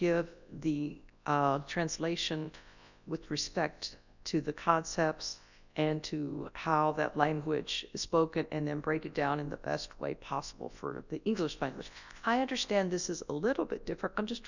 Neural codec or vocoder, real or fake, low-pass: codec, 24 kHz, 0.9 kbps, WavTokenizer, large speech release; fake; 7.2 kHz